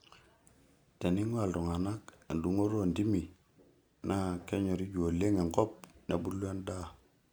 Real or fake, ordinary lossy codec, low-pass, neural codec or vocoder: real; none; none; none